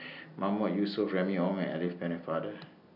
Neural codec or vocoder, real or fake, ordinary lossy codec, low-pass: none; real; none; 5.4 kHz